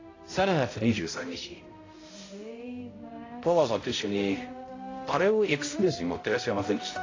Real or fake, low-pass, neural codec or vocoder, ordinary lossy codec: fake; 7.2 kHz; codec, 16 kHz, 0.5 kbps, X-Codec, HuBERT features, trained on balanced general audio; AAC, 32 kbps